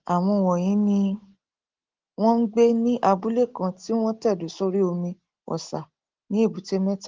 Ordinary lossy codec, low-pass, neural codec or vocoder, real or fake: Opus, 16 kbps; 7.2 kHz; none; real